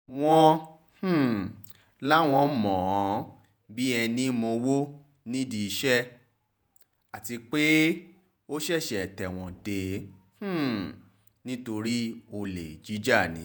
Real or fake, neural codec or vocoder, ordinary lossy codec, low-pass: fake; vocoder, 48 kHz, 128 mel bands, Vocos; none; none